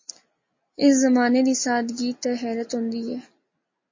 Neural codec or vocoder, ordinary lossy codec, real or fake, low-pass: none; MP3, 32 kbps; real; 7.2 kHz